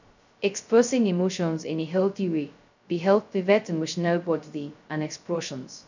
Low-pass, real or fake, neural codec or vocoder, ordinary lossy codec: 7.2 kHz; fake; codec, 16 kHz, 0.2 kbps, FocalCodec; none